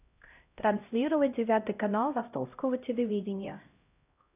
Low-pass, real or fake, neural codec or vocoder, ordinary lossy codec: 3.6 kHz; fake; codec, 16 kHz, 0.5 kbps, X-Codec, HuBERT features, trained on LibriSpeech; none